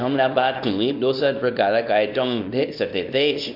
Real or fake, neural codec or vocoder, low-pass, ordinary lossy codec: fake; codec, 24 kHz, 0.9 kbps, WavTokenizer, small release; 5.4 kHz; none